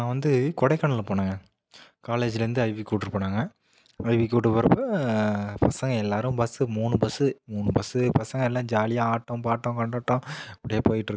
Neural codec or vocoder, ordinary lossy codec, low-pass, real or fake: none; none; none; real